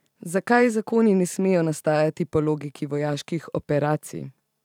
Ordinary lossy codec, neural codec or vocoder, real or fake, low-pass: none; vocoder, 44.1 kHz, 128 mel bands every 512 samples, BigVGAN v2; fake; 19.8 kHz